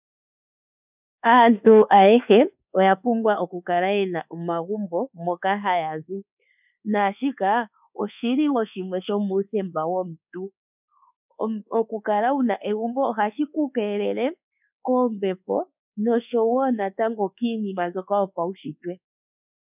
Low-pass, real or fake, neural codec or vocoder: 3.6 kHz; fake; codec, 24 kHz, 1.2 kbps, DualCodec